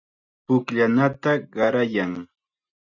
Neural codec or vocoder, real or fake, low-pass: none; real; 7.2 kHz